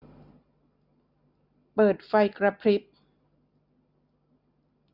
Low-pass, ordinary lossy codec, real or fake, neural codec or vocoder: 5.4 kHz; none; real; none